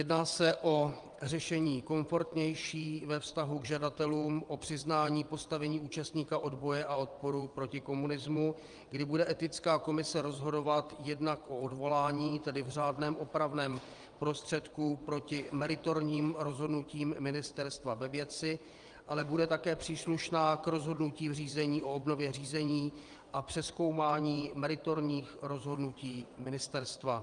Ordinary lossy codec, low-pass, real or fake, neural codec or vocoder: Opus, 32 kbps; 9.9 kHz; fake; vocoder, 22.05 kHz, 80 mel bands, WaveNeXt